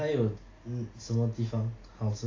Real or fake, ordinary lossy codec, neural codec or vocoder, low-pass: real; AAC, 32 kbps; none; 7.2 kHz